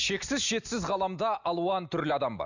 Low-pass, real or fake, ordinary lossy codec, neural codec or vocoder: 7.2 kHz; real; none; none